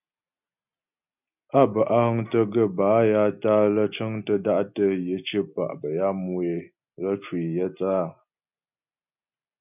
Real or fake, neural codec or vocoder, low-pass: real; none; 3.6 kHz